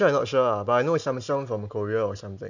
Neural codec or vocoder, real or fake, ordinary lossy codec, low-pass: none; real; none; 7.2 kHz